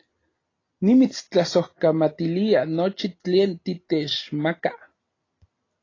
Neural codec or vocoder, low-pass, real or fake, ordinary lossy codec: none; 7.2 kHz; real; AAC, 32 kbps